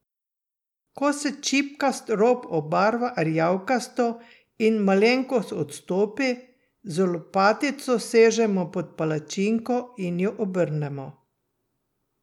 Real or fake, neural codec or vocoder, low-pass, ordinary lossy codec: real; none; 19.8 kHz; none